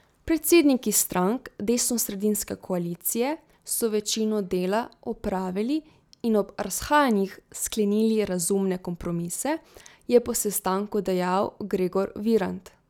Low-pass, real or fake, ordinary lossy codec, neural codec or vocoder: 19.8 kHz; real; none; none